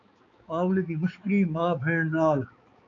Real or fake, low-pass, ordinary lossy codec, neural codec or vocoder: fake; 7.2 kHz; AAC, 48 kbps; codec, 16 kHz, 4 kbps, X-Codec, HuBERT features, trained on balanced general audio